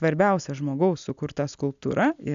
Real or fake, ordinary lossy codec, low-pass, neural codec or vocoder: real; AAC, 96 kbps; 7.2 kHz; none